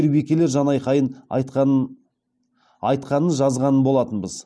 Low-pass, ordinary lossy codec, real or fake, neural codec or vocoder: none; none; real; none